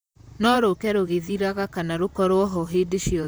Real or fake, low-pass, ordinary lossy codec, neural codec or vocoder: fake; none; none; vocoder, 44.1 kHz, 128 mel bands, Pupu-Vocoder